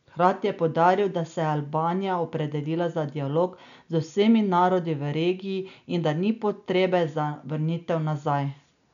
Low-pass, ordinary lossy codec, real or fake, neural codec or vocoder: 7.2 kHz; none; real; none